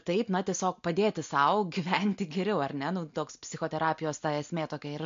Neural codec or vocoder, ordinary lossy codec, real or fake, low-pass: none; MP3, 48 kbps; real; 7.2 kHz